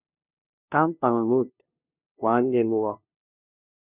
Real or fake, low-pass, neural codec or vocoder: fake; 3.6 kHz; codec, 16 kHz, 0.5 kbps, FunCodec, trained on LibriTTS, 25 frames a second